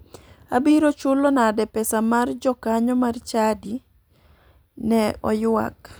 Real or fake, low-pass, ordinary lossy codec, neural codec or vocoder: fake; none; none; vocoder, 44.1 kHz, 128 mel bands every 256 samples, BigVGAN v2